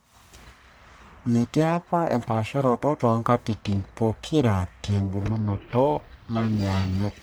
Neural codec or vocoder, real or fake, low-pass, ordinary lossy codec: codec, 44.1 kHz, 1.7 kbps, Pupu-Codec; fake; none; none